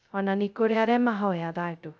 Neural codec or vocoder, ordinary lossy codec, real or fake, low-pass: codec, 16 kHz, 0.2 kbps, FocalCodec; none; fake; none